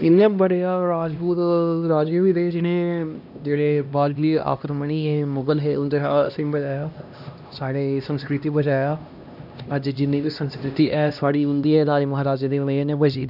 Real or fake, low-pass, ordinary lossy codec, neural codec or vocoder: fake; 5.4 kHz; none; codec, 16 kHz, 1 kbps, X-Codec, HuBERT features, trained on LibriSpeech